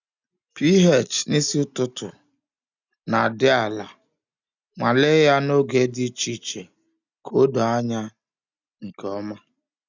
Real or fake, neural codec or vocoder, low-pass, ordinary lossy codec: real; none; 7.2 kHz; none